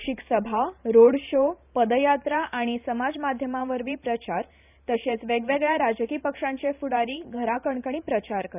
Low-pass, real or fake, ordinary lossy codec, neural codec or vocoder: 3.6 kHz; fake; none; vocoder, 44.1 kHz, 128 mel bands every 512 samples, BigVGAN v2